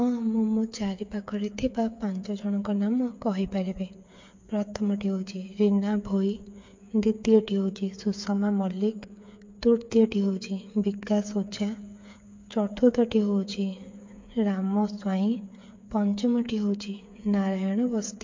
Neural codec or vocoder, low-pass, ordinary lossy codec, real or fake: codec, 16 kHz, 8 kbps, FreqCodec, smaller model; 7.2 kHz; MP3, 48 kbps; fake